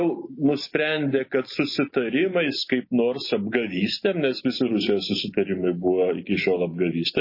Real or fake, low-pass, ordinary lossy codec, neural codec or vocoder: real; 5.4 kHz; MP3, 24 kbps; none